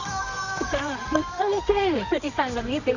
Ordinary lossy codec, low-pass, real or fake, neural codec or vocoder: none; 7.2 kHz; fake; codec, 24 kHz, 0.9 kbps, WavTokenizer, medium music audio release